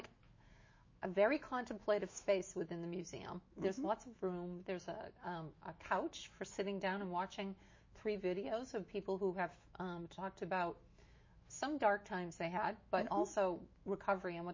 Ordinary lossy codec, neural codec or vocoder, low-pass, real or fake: MP3, 32 kbps; autoencoder, 48 kHz, 128 numbers a frame, DAC-VAE, trained on Japanese speech; 7.2 kHz; fake